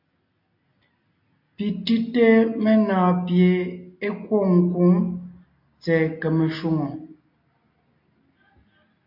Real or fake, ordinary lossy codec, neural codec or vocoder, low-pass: real; MP3, 32 kbps; none; 5.4 kHz